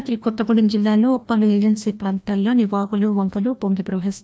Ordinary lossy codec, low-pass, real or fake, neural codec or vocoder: none; none; fake; codec, 16 kHz, 1 kbps, FreqCodec, larger model